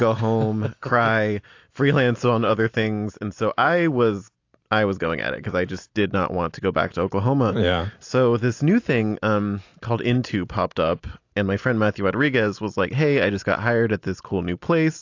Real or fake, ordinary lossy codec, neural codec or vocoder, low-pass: real; AAC, 48 kbps; none; 7.2 kHz